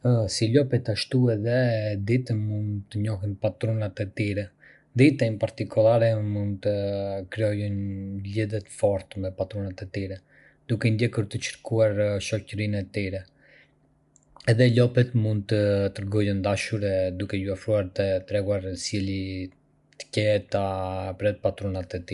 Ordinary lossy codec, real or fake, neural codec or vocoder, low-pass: none; real; none; 10.8 kHz